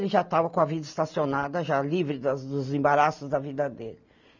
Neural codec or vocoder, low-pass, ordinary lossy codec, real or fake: none; 7.2 kHz; none; real